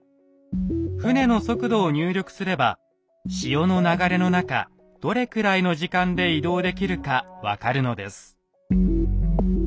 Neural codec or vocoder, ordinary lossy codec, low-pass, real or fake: none; none; none; real